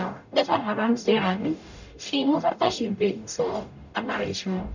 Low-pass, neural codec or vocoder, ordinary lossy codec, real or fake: 7.2 kHz; codec, 44.1 kHz, 0.9 kbps, DAC; none; fake